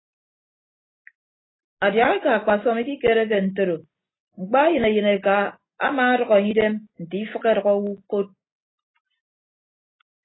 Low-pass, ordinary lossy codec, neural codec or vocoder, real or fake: 7.2 kHz; AAC, 16 kbps; none; real